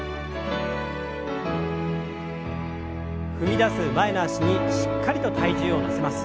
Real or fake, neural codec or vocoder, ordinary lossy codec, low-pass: real; none; none; none